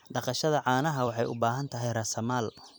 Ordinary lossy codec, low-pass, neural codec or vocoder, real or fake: none; none; vocoder, 44.1 kHz, 128 mel bands every 256 samples, BigVGAN v2; fake